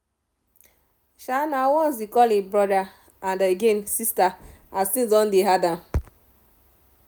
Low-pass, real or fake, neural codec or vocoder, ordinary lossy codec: none; real; none; none